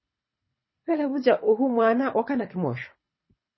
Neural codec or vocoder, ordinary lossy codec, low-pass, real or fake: codec, 24 kHz, 6 kbps, HILCodec; MP3, 24 kbps; 7.2 kHz; fake